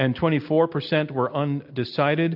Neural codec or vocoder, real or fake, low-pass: none; real; 5.4 kHz